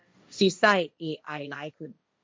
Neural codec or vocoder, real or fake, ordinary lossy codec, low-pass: codec, 16 kHz, 1.1 kbps, Voila-Tokenizer; fake; none; none